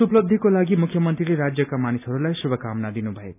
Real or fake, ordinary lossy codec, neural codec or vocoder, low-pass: real; none; none; 3.6 kHz